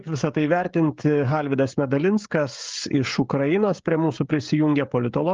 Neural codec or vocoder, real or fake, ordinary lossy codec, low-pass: codec, 16 kHz, 16 kbps, FreqCodec, smaller model; fake; Opus, 24 kbps; 7.2 kHz